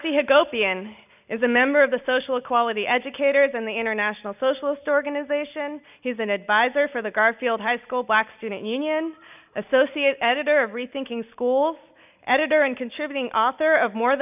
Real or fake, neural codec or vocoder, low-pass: real; none; 3.6 kHz